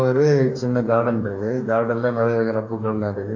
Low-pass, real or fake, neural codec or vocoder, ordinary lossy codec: 7.2 kHz; fake; codec, 44.1 kHz, 2.6 kbps, DAC; AAC, 48 kbps